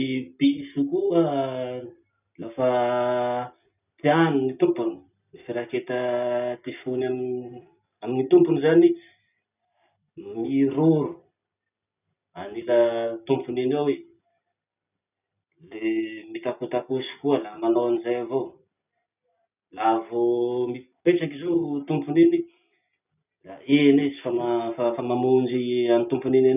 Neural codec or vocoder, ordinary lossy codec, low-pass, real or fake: none; none; 3.6 kHz; real